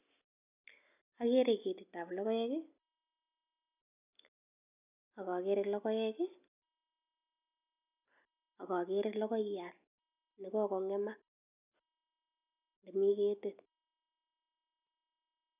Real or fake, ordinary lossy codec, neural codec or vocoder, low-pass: real; none; none; 3.6 kHz